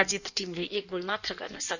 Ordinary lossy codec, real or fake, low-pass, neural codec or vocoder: none; fake; 7.2 kHz; codec, 16 kHz in and 24 kHz out, 1.1 kbps, FireRedTTS-2 codec